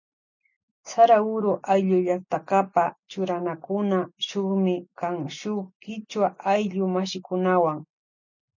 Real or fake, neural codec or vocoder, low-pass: real; none; 7.2 kHz